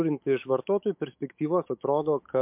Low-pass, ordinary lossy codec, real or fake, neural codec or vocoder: 3.6 kHz; MP3, 32 kbps; fake; autoencoder, 48 kHz, 128 numbers a frame, DAC-VAE, trained on Japanese speech